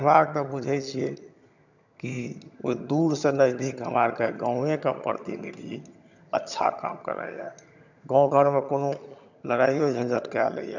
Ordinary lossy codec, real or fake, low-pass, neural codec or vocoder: none; fake; 7.2 kHz; vocoder, 22.05 kHz, 80 mel bands, HiFi-GAN